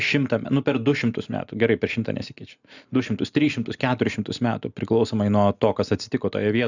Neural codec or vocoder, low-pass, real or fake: none; 7.2 kHz; real